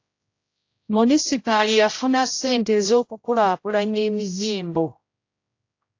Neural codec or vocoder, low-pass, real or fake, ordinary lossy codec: codec, 16 kHz, 0.5 kbps, X-Codec, HuBERT features, trained on general audio; 7.2 kHz; fake; AAC, 48 kbps